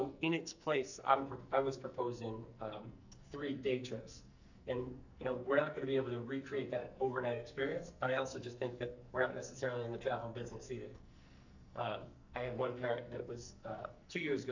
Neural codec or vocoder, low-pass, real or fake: codec, 44.1 kHz, 2.6 kbps, SNAC; 7.2 kHz; fake